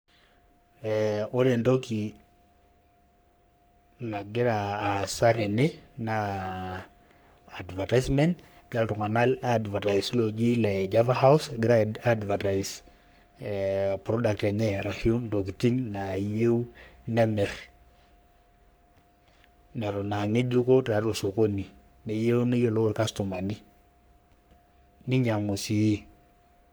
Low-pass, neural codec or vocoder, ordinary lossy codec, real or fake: none; codec, 44.1 kHz, 3.4 kbps, Pupu-Codec; none; fake